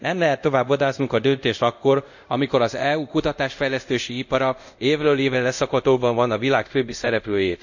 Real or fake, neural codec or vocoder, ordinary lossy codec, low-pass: fake; codec, 24 kHz, 0.5 kbps, DualCodec; none; 7.2 kHz